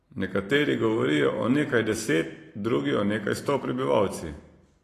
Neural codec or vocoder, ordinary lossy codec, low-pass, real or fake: vocoder, 44.1 kHz, 128 mel bands every 512 samples, BigVGAN v2; AAC, 48 kbps; 14.4 kHz; fake